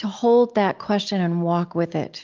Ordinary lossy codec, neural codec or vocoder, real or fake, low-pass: Opus, 24 kbps; none; real; 7.2 kHz